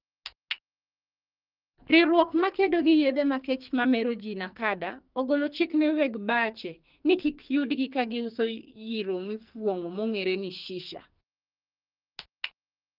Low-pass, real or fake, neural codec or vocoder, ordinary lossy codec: 5.4 kHz; fake; codec, 44.1 kHz, 2.6 kbps, SNAC; Opus, 24 kbps